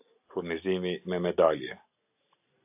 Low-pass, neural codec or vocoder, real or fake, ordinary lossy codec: 3.6 kHz; none; real; AAC, 32 kbps